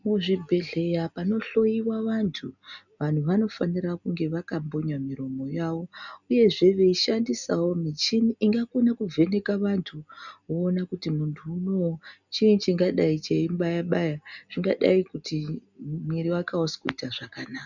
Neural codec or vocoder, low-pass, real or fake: none; 7.2 kHz; real